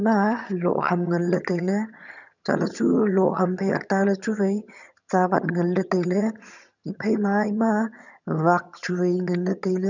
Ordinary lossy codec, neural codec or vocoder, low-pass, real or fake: none; vocoder, 22.05 kHz, 80 mel bands, HiFi-GAN; 7.2 kHz; fake